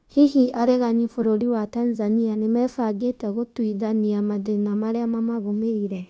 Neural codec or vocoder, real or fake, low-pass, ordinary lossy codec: codec, 16 kHz, 0.9 kbps, LongCat-Audio-Codec; fake; none; none